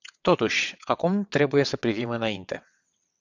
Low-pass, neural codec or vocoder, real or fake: 7.2 kHz; vocoder, 22.05 kHz, 80 mel bands, WaveNeXt; fake